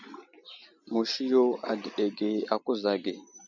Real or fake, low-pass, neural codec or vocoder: real; 7.2 kHz; none